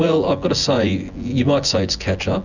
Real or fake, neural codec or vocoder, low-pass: fake; vocoder, 24 kHz, 100 mel bands, Vocos; 7.2 kHz